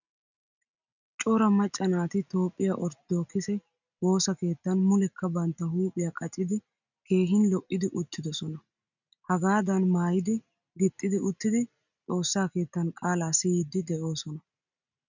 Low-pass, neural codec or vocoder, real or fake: 7.2 kHz; none; real